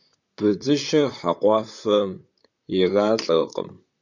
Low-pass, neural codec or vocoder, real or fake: 7.2 kHz; vocoder, 44.1 kHz, 128 mel bands, Pupu-Vocoder; fake